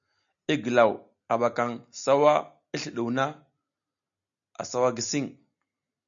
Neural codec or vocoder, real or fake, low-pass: none; real; 7.2 kHz